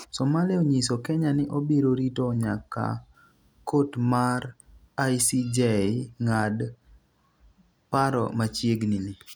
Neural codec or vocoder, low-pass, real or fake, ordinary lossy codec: none; none; real; none